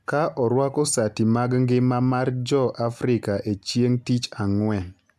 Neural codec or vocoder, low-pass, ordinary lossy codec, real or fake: none; 14.4 kHz; none; real